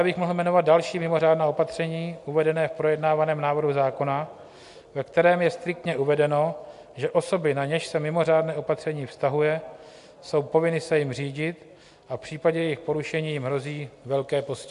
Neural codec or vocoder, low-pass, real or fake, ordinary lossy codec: none; 10.8 kHz; real; MP3, 64 kbps